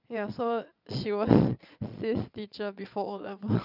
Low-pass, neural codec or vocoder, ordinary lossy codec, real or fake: 5.4 kHz; vocoder, 22.05 kHz, 80 mel bands, Vocos; none; fake